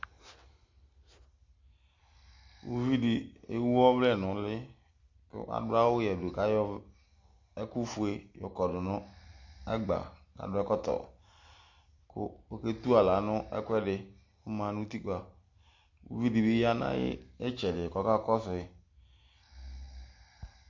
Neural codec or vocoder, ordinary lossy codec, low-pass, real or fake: none; MP3, 48 kbps; 7.2 kHz; real